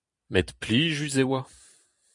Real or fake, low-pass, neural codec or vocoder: fake; 10.8 kHz; vocoder, 44.1 kHz, 128 mel bands every 256 samples, BigVGAN v2